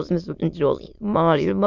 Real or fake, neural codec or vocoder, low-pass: fake; autoencoder, 22.05 kHz, a latent of 192 numbers a frame, VITS, trained on many speakers; 7.2 kHz